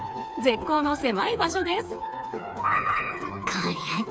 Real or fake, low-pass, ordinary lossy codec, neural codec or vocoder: fake; none; none; codec, 16 kHz, 4 kbps, FreqCodec, smaller model